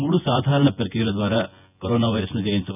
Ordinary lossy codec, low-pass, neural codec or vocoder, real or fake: none; 3.6 kHz; vocoder, 24 kHz, 100 mel bands, Vocos; fake